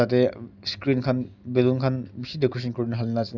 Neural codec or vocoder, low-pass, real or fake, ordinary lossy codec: none; 7.2 kHz; real; none